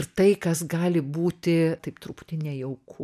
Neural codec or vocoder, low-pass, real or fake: none; 14.4 kHz; real